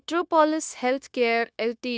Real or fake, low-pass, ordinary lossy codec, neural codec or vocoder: fake; none; none; codec, 16 kHz, 0.9 kbps, LongCat-Audio-Codec